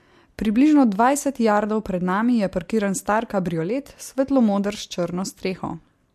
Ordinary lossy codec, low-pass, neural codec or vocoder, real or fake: MP3, 64 kbps; 14.4 kHz; none; real